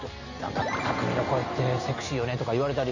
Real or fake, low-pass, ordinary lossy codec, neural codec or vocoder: real; 7.2 kHz; none; none